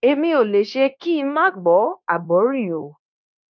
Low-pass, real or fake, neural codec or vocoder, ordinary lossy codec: 7.2 kHz; fake; codec, 16 kHz, 0.9 kbps, LongCat-Audio-Codec; none